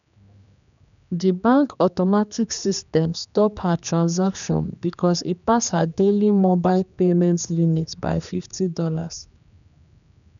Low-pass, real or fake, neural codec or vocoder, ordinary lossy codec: 7.2 kHz; fake; codec, 16 kHz, 2 kbps, X-Codec, HuBERT features, trained on general audio; none